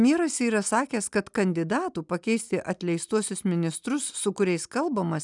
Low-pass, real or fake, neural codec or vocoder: 10.8 kHz; real; none